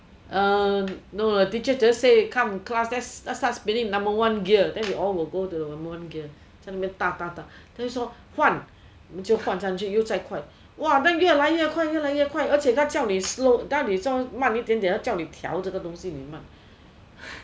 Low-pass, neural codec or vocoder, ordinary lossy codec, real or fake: none; none; none; real